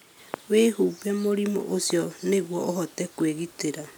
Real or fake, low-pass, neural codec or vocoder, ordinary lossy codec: real; none; none; none